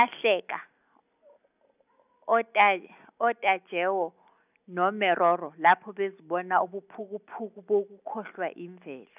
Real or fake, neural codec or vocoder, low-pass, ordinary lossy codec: real; none; 3.6 kHz; none